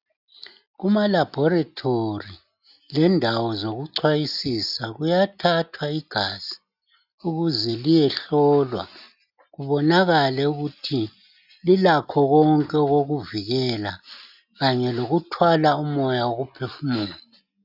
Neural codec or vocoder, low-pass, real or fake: none; 5.4 kHz; real